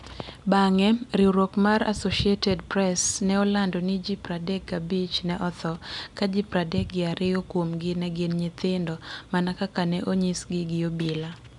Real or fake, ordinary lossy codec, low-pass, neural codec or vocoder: real; none; 10.8 kHz; none